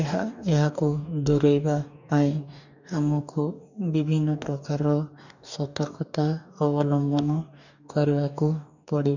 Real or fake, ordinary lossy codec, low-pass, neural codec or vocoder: fake; none; 7.2 kHz; codec, 44.1 kHz, 2.6 kbps, DAC